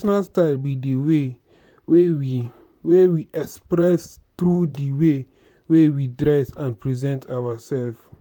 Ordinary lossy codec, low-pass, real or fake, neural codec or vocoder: none; 19.8 kHz; fake; vocoder, 44.1 kHz, 128 mel bands, Pupu-Vocoder